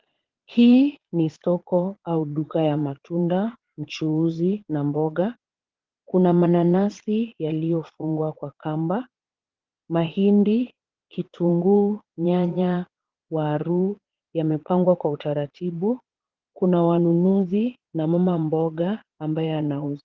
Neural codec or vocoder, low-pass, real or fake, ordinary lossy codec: vocoder, 22.05 kHz, 80 mel bands, Vocos; 7.2 kHz; fake; Opus, 16 kbps